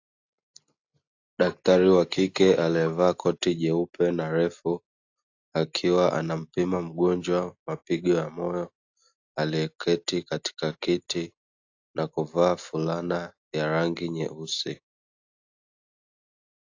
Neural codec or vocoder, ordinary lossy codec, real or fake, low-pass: none; AAC, 48 kbps; real; 7.2 kHz